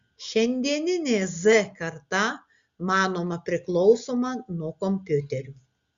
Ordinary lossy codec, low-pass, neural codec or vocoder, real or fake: Opus, 64 kbps; 7.2 kHz; none; real